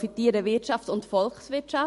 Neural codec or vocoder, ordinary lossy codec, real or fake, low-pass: vocoder, 44.1 kHz, 128 mel bands every 256 samples, BigVGAN v2; MP3, 48 kbps; fake; 14.4 kHz